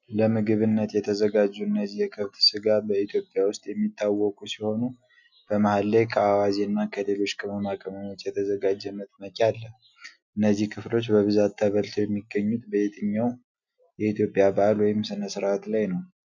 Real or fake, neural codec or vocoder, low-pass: real; none; 7.2 kHz